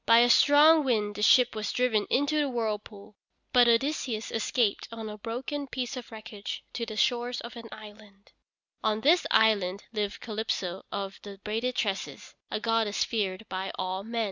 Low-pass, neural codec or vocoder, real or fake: 7.2 kHz; none; real